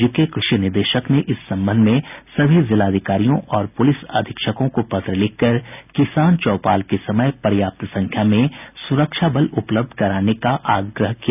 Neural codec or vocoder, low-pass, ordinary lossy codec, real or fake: none; 3.6 kHz; none; real